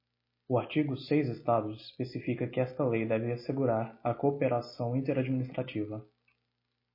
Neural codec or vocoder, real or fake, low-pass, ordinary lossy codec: none; real; 5.4 kHz; MP3, 24 kbps